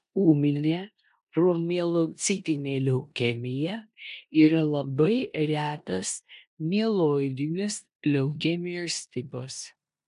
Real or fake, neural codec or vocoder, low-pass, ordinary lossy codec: fake; codec, 16 kHz in and 24 kHz out, 0.9 kbps, LongCat-Audio-Codec, four codebook decoder; 10.8 kHz; AAC, 96 kbps